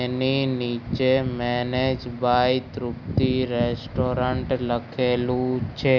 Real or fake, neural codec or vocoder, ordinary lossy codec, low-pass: real; none; none; 7.2 kHz